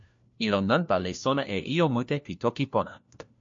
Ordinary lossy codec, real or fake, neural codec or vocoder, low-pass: MP3, 48 kbps; fake; codec, 16 kHz, 1 kbps, FunCodec, trained on LibriTTS, 50 frames a second; 7.2 kHz